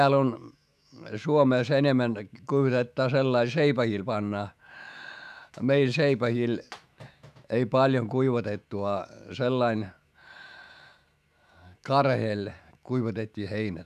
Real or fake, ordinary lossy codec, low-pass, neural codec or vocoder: fake; none; 14.4 kHz; autoencoder, 48 kHz, 128 numbers a frame, DAC-VAE, trained on Japanese speech